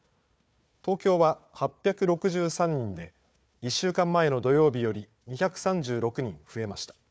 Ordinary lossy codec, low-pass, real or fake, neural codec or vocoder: none; none; fake; codec, 16 kHz, 4 kbps, FunCodec, trained on Chinese and English, 50 frames a second